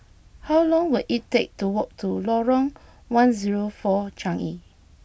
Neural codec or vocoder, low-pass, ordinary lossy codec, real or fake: none; none; none; real